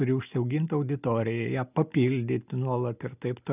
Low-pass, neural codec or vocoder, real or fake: 3.6 kHz; none; real